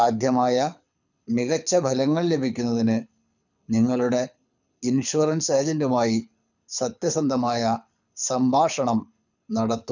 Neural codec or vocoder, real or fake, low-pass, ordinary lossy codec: codec, 24 kHz, 6 kbps, HILCodec; fake; 7.2 kHz; none